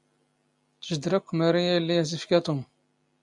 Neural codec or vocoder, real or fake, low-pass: none; real; 10.8 kHz